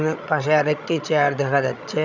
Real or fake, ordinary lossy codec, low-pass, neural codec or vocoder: fake; none; 7.2 kHz; codec, 16 kHz, 16 kbps, FreqCodec, larger model